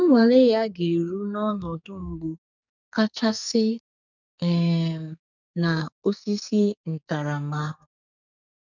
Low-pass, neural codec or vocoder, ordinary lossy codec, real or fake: 7.2 kHz; codec, 44.1 kHz, 2.6 kbps, SNAC; none; fake